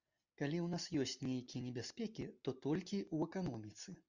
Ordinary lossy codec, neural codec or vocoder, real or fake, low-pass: Opus, 64 kbps; none; real; 7.2 kHz